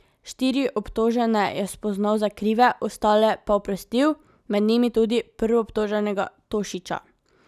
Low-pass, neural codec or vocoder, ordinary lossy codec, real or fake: 14.4 kHz; none; none; real